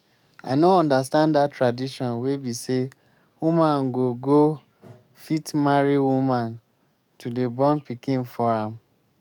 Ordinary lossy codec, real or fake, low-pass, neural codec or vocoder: none; fake; 19.8 kHz; codec, 44.1 kHz, 7.8 kbps, DAC